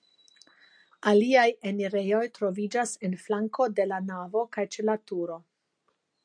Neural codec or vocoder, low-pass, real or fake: none; 9.9 kHz; real